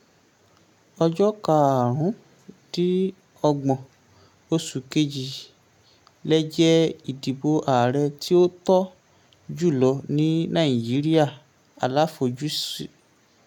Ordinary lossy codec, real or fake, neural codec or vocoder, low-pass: none; real; none; 19.8 kHz